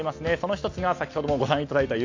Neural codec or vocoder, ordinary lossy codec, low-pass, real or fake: none; AAC, 48 kbps; 7.2 kHz; real